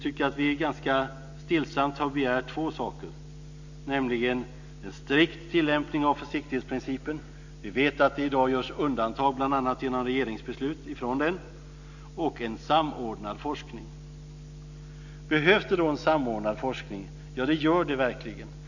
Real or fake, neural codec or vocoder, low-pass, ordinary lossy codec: real; none; 7.2 kHz; none